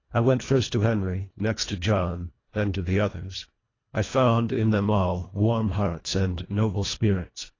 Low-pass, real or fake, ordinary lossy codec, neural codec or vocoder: 7.2 kHz; fake; AAC, 32 kbps; codec, 24 kHz, 1.5 kbps, HILCodec